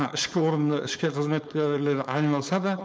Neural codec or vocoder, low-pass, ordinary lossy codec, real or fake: codec, 16 kHz, 4.8 kbps, FACodec; none; none; fake